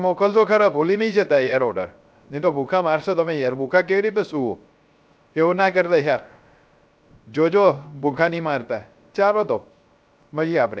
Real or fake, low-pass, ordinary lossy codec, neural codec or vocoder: fake; none; none; codec, 16 kHz, 0.3 kbps, FocalCodec